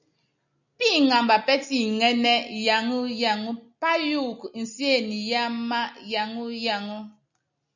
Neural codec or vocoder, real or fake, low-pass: none; real; 7.2 kHz